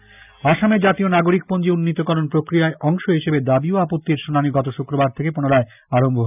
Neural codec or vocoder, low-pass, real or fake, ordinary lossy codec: none; 3.6 kHz; real; none